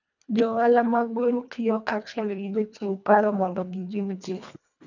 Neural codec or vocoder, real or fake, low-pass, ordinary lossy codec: codec, 24 kHz, 1.5 kbps, HILCodec; fake; 7.2 kHz; none